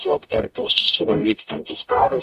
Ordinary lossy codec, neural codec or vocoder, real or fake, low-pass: Opus, 64 kbps; codec, 44.1 kHz, 0.9 kbps, DAC; fake; 14.4 kHz